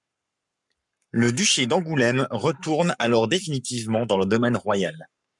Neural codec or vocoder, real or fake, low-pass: codec, 44.1 kHz, 7.8 kbps, Pupu-Codec; fake; 10.8 kHz